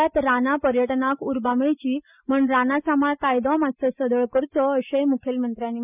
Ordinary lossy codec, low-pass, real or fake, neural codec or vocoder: none; 3.6 kHz; real; none